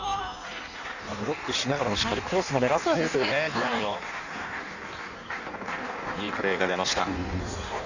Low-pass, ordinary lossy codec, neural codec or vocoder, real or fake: 7.2 kHz; none; codec, 16 kHz in and 24 kHz out, 1.1 kbps, FireRedTTS-2 codec; fake